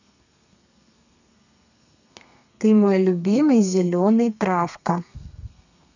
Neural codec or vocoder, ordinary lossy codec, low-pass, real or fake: codec, 32 kHz, 1.9 kbps, SNAC; none; 7.2 kHz; fake